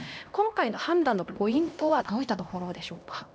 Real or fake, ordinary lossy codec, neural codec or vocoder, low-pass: fake; none; codec, 16 kHz, 1 kbps, X-Codec, HuBERT features, trained on LibriSpeech; none